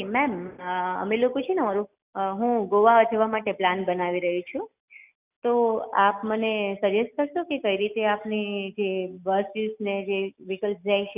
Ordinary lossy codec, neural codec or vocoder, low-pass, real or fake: none; none; 3.6 kHz; real